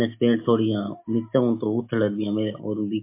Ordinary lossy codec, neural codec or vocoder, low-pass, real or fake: MP3, 24 kbps; none; 3.6 kHz; real